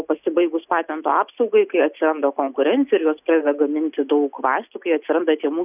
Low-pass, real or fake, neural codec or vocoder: 3.6 kHz; real; none